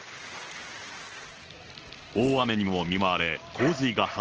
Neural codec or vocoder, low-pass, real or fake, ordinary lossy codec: none; 7.2 kHz; real; Opus, 16 kbps